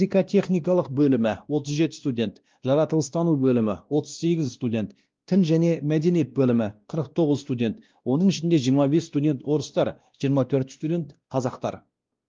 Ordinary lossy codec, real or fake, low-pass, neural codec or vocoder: Opus, 32 kbps; fake; 7.2 kHz; codec, 16 kHz, 1 kbps, X-Codec, WavLM features, trained on Multilingual LibriSpeech